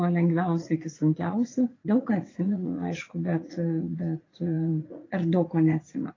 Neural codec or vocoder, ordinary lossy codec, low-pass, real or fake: vocoder, 44.1 kHz, 80 mel bands, Vocos; AAC, 32 kbps; 7.2 kHz; fake